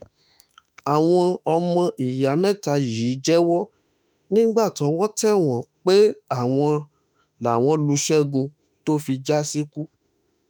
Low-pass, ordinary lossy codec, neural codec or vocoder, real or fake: none; none; autoencoder, 48 kHz, 32 numbers a frame, DAC-VAE, trained on Japanese speech; fake